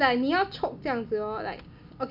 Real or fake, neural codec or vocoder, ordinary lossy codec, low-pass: real; none; none; 5.4 kHz